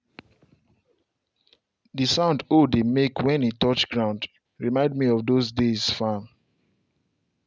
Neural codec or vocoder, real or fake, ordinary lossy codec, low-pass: none; real; none; none